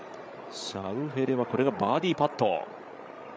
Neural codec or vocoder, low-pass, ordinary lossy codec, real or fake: codec, 16 kHz, 16 kbps, FreqCodec, larger model; none; none; fake